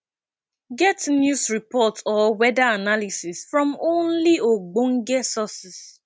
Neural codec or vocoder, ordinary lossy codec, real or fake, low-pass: none; none; real; none